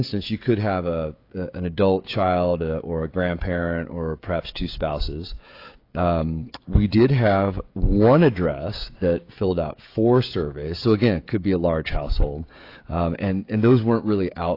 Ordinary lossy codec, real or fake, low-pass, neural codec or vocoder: AAC, 32 kbps; fake; 5.4 kHz; codec, 16 kHz, 16 kbps, FreqCodec, smaller model